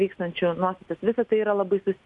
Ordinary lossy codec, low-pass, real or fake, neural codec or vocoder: MP3, 96 kbps; 10.8 kHz; real; none